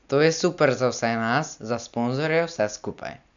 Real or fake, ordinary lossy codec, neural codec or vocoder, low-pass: real; none; none; 7.2 kHz